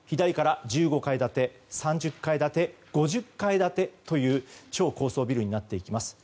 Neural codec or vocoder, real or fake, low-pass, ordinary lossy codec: none; real; none; none